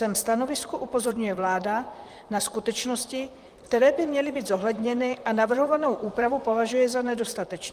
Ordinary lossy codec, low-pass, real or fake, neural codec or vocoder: Opus, 32 kbps; 14.4 kHz; fake; vocoder, 44.1 kHz, 128 mel bands, Pupu-Vocoder